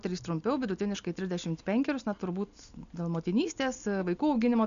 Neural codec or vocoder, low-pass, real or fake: none; 7.2 kHz; real